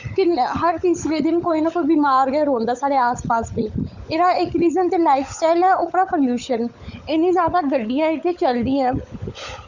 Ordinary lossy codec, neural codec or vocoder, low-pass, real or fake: Opus, 64 kbps; codec, 16 kHz, 16 kbps, FunCodec, trained on LibriTTS, 50 frames a second; 7.2 kHz; fake